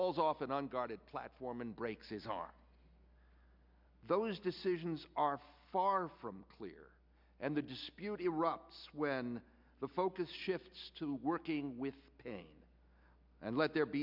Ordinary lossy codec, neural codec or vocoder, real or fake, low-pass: MP3, 48 kbps; none; real; 5.4 kHz